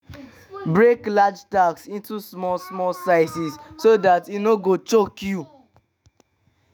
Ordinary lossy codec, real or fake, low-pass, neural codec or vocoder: none; fake; none; autoencoder, 48 kHz, 128 numbers a frame, DAC-VAE, trained on Japanese speech